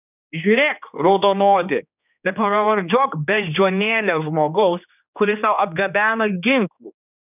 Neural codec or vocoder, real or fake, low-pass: codec, 16 kHz, 2 kbps, X-Codec, HuBERT features, trained on general audio; fake; 3.6 kHz